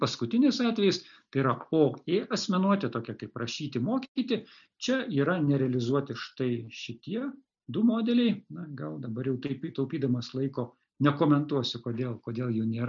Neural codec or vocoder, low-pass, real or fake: none; 7.2 kHz; real